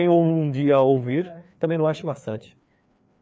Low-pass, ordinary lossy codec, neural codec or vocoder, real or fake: none; none; codec, 16 kHz, 2 kbps, FreqCodec, larger model; fake